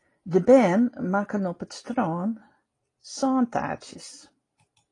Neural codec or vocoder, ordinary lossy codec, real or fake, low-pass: none; AAC, 32 kbps; real; 10.8 kHz